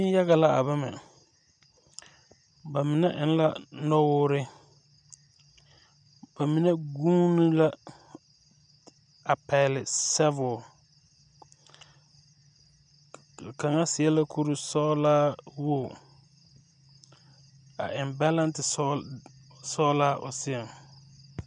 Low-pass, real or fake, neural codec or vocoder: 9.9 kHz; real; none